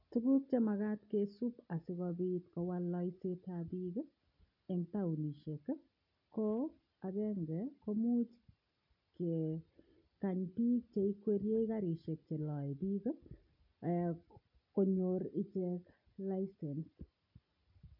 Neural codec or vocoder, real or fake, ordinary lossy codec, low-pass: none; real; none; 5.4 kHz